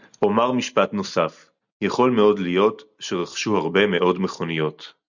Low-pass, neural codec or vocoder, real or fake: 7.2 kHz; none; real